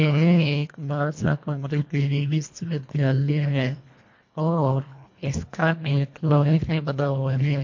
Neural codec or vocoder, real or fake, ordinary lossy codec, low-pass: codec, 24 kHz, 1.5 kbps, HILCodec; fake; MP3, 48 kbps; 7.2 kHz